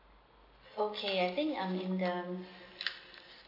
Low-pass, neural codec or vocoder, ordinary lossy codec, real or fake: 5.4 kHz; none; AAC, 48 kbps; real